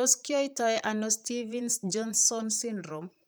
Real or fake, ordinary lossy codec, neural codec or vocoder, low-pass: fake; none; vocoder, 44.1 kHz, 128 mel bands, Pupu-Vocoder; none